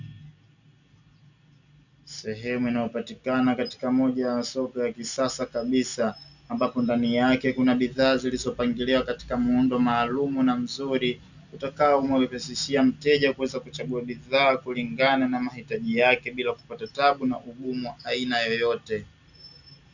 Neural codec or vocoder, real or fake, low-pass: none; real; 7.2 kHz